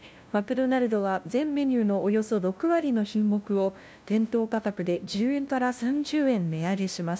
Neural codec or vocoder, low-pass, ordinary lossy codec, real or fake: codec, 16 kHz, 0.5 kbps, FunCodec, trained on LibriTTS, 25 frames a second; none; none; fake